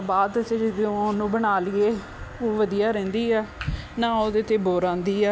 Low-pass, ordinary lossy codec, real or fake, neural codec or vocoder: none; none; real; none